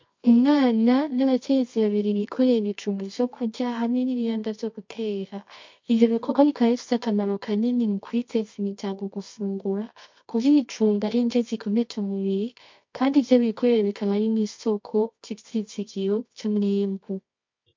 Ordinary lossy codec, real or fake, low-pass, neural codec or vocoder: MP3, 48 kbps; fake; 7.2 kHz; codec, 24 kHz, 0.9 kbps, WavTokenizer, medium music audio release